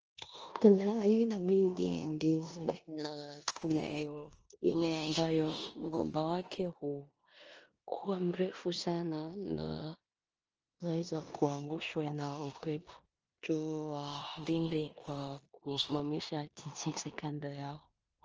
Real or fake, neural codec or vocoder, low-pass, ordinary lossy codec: fake; codec, 16 kHz in and 24 kHz out, 0.9 kbps, LongCat-Audio-Codec, four codebook decoder; 7.2 kHz; Opus, 24 kbps